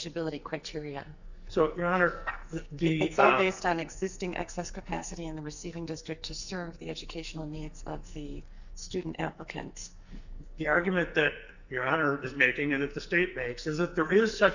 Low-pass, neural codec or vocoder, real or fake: 7.2 kHz; codec, 44.1 kHz, 2.6 kbps, SNAC; fake